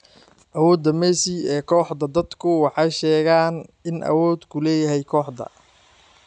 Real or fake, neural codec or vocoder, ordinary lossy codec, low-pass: real; none; none; 9.9 kHz